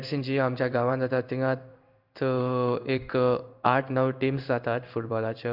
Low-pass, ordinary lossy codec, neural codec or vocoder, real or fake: 5.4 kHz; none; codec, 16 kHz in and 24 kHz out, 1 kbps, XY-Tokenizer; fake